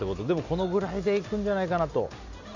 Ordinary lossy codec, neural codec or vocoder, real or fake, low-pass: none; none; real; 7.2 kHz